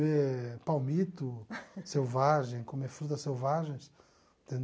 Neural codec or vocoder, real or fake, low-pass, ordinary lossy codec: none; real; none; none